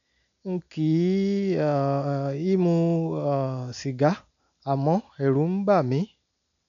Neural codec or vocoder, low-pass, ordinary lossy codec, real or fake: none; 7.2 kHz; none; real